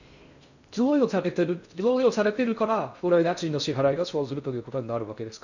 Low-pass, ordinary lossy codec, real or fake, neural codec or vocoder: 7.2 kHz; none; fake; codec, 16 kHz in and 24 kHz out, 0.6 kbps, FocalCodec, streaming, 4096 codes